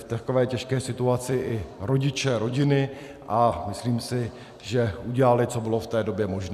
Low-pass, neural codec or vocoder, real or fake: 14.4 kHz; vocoder, 44.1 kHz, 128 mel bands every 256 samples, BigVGAN v2; fake